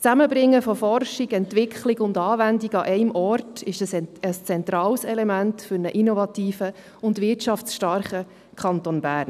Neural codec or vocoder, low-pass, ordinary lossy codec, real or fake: none; 14.4 kHz; none; real